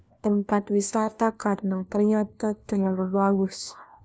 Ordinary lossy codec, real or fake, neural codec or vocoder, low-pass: none; fake; codec, 16 kHz, 1 kbps, FunCodec, trained on LibriTTS, 50 frames a second; none